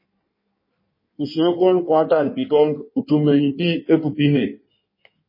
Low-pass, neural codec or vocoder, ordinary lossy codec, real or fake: 5.4 kHz; codec, 16 kHz in and 24 kHz out, 1.1 kbps, FireRedTTS-2 codec; MP3, 24 kbps; fake